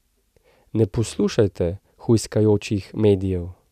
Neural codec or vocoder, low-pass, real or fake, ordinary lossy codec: none; 14.4 kHz; real; none